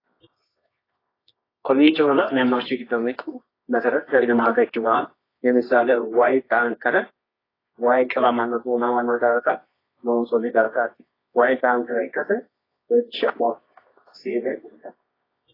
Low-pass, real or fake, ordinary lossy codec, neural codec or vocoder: 5.4 kHz; fake; AAC, 24 kbps; codec, 24 kHz, 0.9 kbps, WavTokenizer, medium music audio release